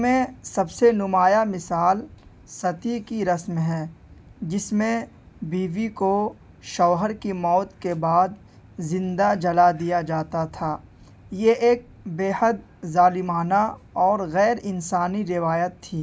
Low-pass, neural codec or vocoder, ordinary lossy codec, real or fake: none; none; none; real